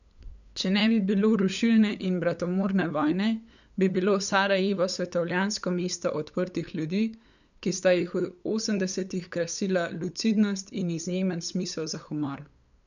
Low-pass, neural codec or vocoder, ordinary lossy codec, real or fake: 7.2 kHz; codec, 16 kHz, 8 kbps, FunCodec, trained on LibriTTS, 25 frames a second; none; fake